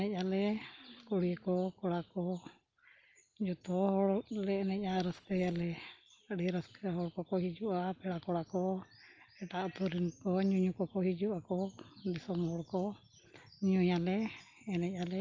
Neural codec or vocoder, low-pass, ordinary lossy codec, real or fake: none; 7.2 kHz; Opus, 24 kbps; real